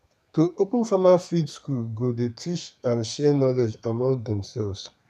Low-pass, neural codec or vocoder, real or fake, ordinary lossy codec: 14.4 kHz; codec, 32 kHz, 1.9 kbps, SNAC; fake; none